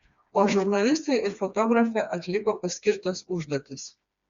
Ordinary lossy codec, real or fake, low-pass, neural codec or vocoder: Opus, 64 kbps; fake; 7.2 kHz; codec, 16 kHz, 2 kbps, FreqCodec, smaller model